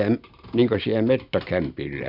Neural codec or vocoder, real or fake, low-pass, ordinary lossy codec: none; real; 5.4 kHz; none